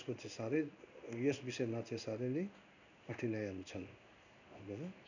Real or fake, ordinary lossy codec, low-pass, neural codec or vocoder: fake; none; 7.2 kHz; codec, 16 kHz in and 24 kHz out, 1 kbps, XY-Tokenizer